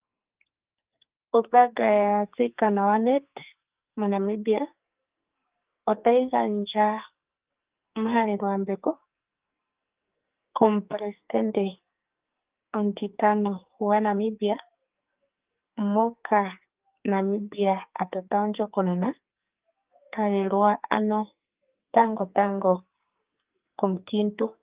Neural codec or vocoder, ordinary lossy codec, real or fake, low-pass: codec, 44.1 kHz, 2.6 kbps, SNAC; Opus, 32 kbps; fake; 3.6 kHz